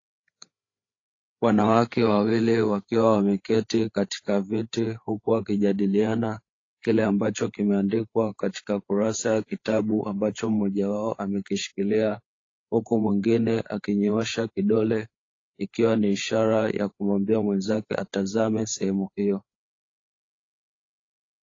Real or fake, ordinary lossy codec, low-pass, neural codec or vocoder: fake; AAC, 32 kbps; 7.2 kHz; codec, 16 kHz, 8 kbps, FreqCodec, larger model